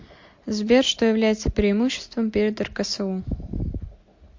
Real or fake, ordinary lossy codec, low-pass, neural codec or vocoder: real; MP3, 48 kbps; 7.2 kHz; none